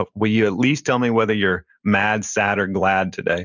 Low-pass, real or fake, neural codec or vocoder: 7.2 kHz; real; none